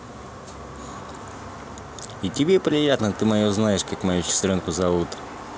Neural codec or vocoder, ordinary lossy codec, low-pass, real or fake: none; none; none; real